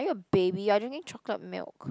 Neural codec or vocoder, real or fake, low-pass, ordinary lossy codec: none; real; none; none